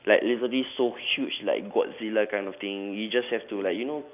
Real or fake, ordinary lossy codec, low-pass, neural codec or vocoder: real; none; 3.6 kHz; none